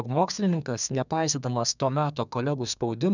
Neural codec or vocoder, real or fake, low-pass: codec, 32 kHz, 1.9 kbps, SNAC; fake; 7.2 kHz